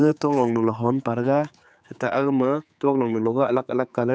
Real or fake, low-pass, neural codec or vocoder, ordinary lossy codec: fake; none; codec, 16 kHz, 4 kbps, X-Codec, HuBERT features, trained on general audio; none